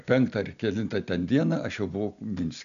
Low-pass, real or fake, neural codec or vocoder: 7.2 kHz; real; none